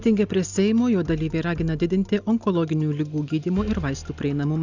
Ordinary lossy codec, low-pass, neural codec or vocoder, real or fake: Opus, 64 kbps; 7.2 kHz; none; real